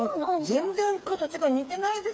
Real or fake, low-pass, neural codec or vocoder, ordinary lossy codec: fake; none; codec, 16 kHz, 4 kbps, FreqCodec, smaller model; none